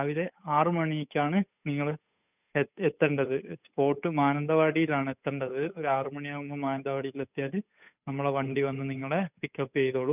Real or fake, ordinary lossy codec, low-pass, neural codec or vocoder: fake; none; 3.6 kHz; codec, 16 kHz, 8 kbps, FunCodec, trained on Chinese and English, 25 frames a second